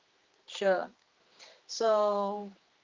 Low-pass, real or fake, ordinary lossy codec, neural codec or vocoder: 7.2 kHz; fake; Opus, 24 kbps; codec, 16 kHz, 2 kbps, X-Codec, HuBERT features, trained on general audio